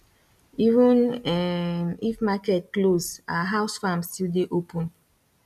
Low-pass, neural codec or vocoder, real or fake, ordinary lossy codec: 14.4 kHz; none; real; none